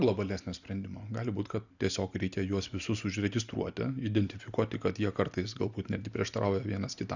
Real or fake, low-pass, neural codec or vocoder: real; 7.2 kHz; none